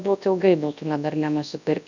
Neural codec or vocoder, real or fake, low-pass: codec, 24 kHz, 0.9 kbps, WavTokenizer, large speech release; fake; 7.2 kHz